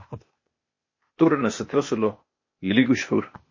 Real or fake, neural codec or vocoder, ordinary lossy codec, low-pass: fake; codec, 16 kHz, 0.8 kbps, ZipCodec; MP3, 32 kbps; 7.2 kHz